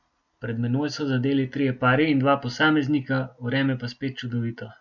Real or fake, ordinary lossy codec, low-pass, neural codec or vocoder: real; none; 7.2 kHz; none